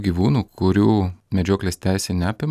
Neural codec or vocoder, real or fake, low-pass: none; real; 14.4 kHz